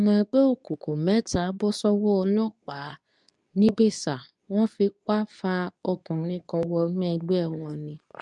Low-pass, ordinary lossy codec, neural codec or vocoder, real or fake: 10.8 kHz; none; codec, 24 kHz, 0.9 kbps, WavTokenizer, medium speech release version 2; fake